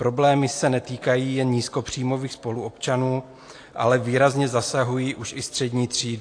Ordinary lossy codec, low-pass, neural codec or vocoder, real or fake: AAC, 48 kbps; 9.9 kHz; none; real